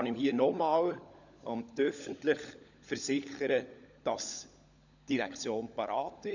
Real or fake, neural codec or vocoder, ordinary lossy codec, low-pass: fake; codec, 16 kHz, 16 kbps, FunCodec, trained on LibriTTS, 50 frames a second; none; 7.2 kHz